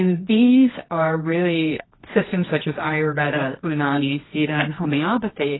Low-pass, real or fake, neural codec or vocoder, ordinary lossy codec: 7.2 kHz; fake; codec, 24 kHz, 0.9 kbps, WavTokenizer, medium music audio release; AAC, 16 kbps